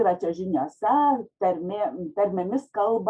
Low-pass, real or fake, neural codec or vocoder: 9.9 kHz; real; none